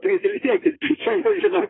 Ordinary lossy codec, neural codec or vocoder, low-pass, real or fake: AAC, 16 kbps; codec, 16 kHz in and 24 kHz out, 2.2 kbps, FireRedTTS-2 codec; 7.2 kHz; fake